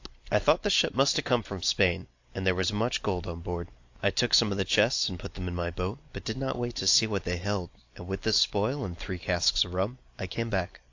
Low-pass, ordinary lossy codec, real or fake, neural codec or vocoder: 7.2 kHz; AAC, 48 kbps; real; none